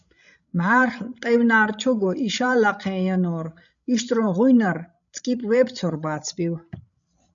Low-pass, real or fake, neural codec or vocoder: 7.2 kHz; fake; codec, 16 kHz, 16 kbps, FreqCodec, larger model